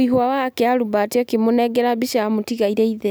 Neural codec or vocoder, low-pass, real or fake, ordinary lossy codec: none; none; real; none